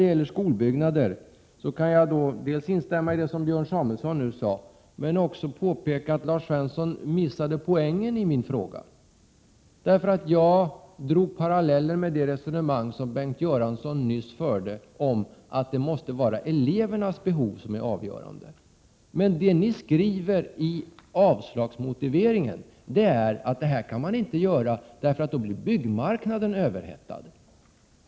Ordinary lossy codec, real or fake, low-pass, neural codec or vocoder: none; real; none; none